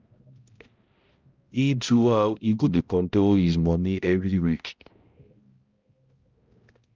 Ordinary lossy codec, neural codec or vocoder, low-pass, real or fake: Opus, 24 kbps; codec, 16 kHz, 0.5 kbps, X-Codec, HuBERT features, trained on balanced general audio; 7.2 kHz; fake